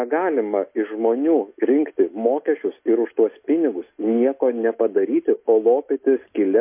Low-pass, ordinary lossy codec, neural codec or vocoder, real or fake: 3.6 kHz; MP3, 24 kbps; none; real